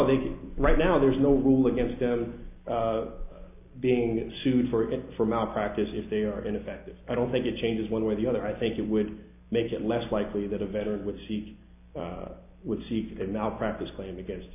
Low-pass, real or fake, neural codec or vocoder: 3.6 kHz; real; none